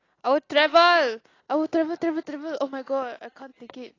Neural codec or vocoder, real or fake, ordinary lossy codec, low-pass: none; real; AAC, 32 kbps; 7.2 kHz